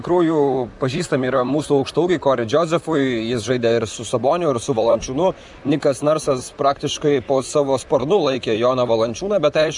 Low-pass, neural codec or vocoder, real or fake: 10.8 kHz; vocoder, 44.1 kHz, 128 mel bands, Pupu-Vocoder; fake